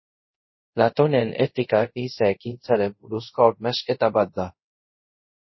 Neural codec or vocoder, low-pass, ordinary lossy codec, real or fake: codec, 24 kHz, 0.5 kbps, DualCodec; 7.2 kHz; MP3, 24 kbps; fake